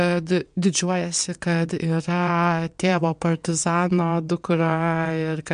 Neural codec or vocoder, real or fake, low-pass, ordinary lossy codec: vocoder, 22.05 kHz, 80 mel bands, WaveNeXt; fake; 9.9 kHz; MP3, 64 kbps